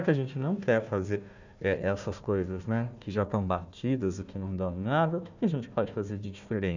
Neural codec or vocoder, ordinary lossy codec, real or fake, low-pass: codec, 16 kHz, 1 kbps, FunCodec, trained on Chinese and English, 50 frames a second; none; fake; 7.2 kHz